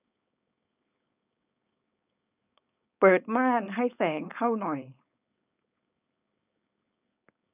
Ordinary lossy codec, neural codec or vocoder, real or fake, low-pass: none; codec, 16 kHz, 4.8 kbps, FACodec; fake; 3.6 kHz